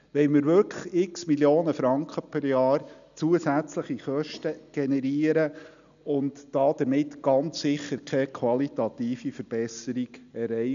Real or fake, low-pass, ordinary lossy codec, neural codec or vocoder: real; 7.2 kHz; none; none